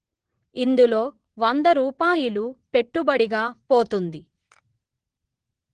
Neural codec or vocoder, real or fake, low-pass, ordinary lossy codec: vocoder, 22.05 kHz, 80 mel bands, WaveNeXt; fake; 9.9 kHz; Opus, 16 kbps